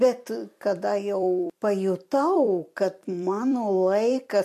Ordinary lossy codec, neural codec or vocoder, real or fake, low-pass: MP3, 64 kbps; vocoder, 44.1 kHz, 128 mel bands every 256 samples, BigVGAN v2; fake; 14.4 kHz